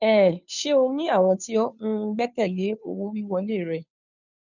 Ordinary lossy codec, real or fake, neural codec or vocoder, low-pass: none; fake; codec, 16 kHz, 2 kbps, FunCodec, trained on Chinese and English, 25 frames a second; 7.2 kHz